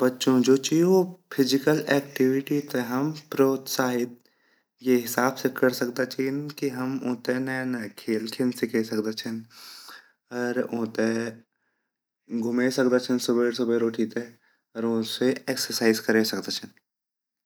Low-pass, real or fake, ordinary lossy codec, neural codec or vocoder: none; real; none; none